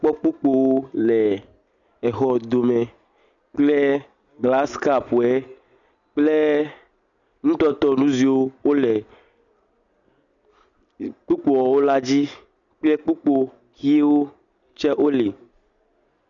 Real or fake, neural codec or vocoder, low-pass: real; none; 7.2 kHz